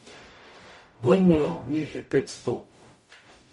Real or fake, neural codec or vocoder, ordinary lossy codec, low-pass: fake; codec, 44.1 kHz, 0.9 kbps, DAC; MP3, 48 kbps; 19.8 kHz